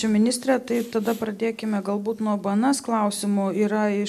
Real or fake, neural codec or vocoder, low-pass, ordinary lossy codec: real; none; 14.4 kHz; MP3, 96 kbps